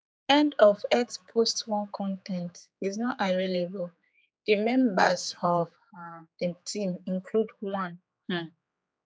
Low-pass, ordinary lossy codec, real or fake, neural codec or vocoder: none; none; fake; codec, 16 kHz, 4 kbps, X-Codec, HuBERT features, trained on general audio